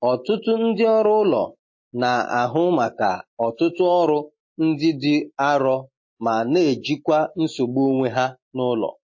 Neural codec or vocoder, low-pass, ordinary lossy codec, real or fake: none; 7.2 kHz; MP3, 32 kbps; real